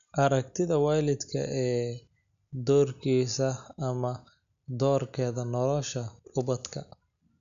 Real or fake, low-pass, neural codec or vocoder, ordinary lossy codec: real; 7.2 kHz; none; AAC, 96 kbps